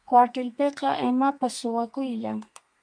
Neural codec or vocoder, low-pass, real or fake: codec, 32 kHz, 1.9 kbps, SNAC; 9.9 kHz; fake